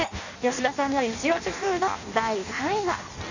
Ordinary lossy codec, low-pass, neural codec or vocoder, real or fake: none; 7.2 kHz; codec, 16 kHz in and 24 kHz out, 0.6 kbps, FireRedTTS-2 codec; fake